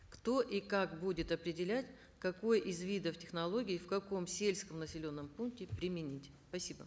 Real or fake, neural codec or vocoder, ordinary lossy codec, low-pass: real; none; none; none